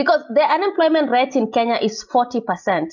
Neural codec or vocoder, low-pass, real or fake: none; 7.2 kHz; real